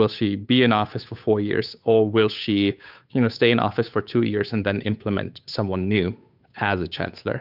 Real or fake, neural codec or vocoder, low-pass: fake; codec, 16 kHz, 8 kbps, FunCodec, trained on Chinese and English, 25 frames a second; 5.4 kHz